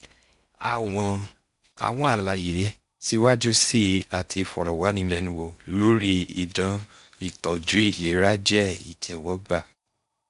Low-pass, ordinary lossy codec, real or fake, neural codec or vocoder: 10.8 kHz; MP3, 96 kbps; fake; codec, 16 kHz in and 24 kHz out, 0.6 kbps, FocalCodec, streaming, 4096 codes